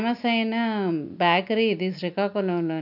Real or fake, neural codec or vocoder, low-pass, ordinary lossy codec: real; none; 5.4 kHz; none